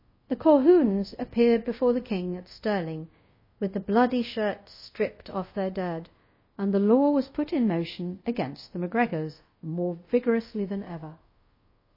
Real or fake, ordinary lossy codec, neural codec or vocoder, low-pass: fake; MP3, 24 kbps; codec, 24 kHz, 0.5 kbps, DualCodec; 5.4 kHz